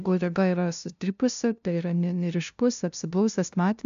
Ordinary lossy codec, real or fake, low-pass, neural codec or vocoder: AAC, 96 kbps; fake; 7.2 kHz; codec, 16 kHz, 0.5 kbps, FunCodec, trained on LibriTTS, 25 frames a second